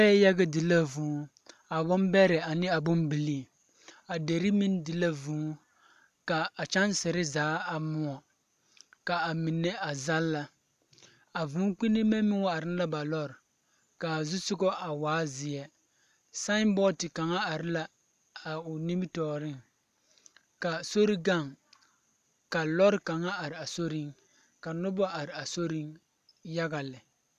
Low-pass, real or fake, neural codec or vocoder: 14.4 kHz; real; none